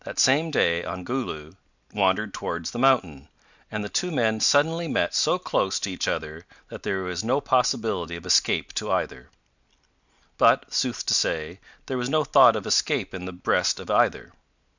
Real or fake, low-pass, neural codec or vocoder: real; 7.2 kHz; none